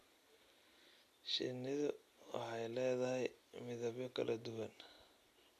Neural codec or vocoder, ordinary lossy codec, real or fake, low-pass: none; AAC, 96 kbps; real; 14.4 kHz